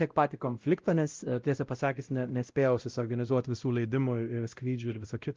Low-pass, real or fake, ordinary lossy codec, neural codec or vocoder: 7.2 kHz; fake; Opus, 24 kbps; codec, 16 kHz, 0.5 kbps, X-Codec, WavLM features, trained on Multilingual LibriSpeech